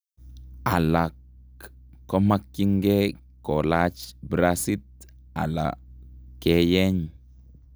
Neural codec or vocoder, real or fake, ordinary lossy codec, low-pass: none; real; none; none